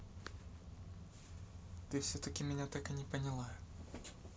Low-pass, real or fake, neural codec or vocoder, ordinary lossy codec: none; real; none; none